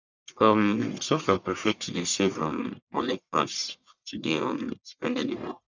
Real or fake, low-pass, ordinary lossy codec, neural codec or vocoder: fake; 7.2 kHz; none; codec, 44.1 kHz, 1.7 kbps, Pupu-Codec